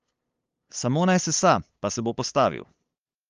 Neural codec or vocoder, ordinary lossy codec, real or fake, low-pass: codec, 16 kHz, 8 kbps, FunCodec, trained on LibriTTS, 25 frames a second; Opus, 32 kbps; fake; 7.2 kHz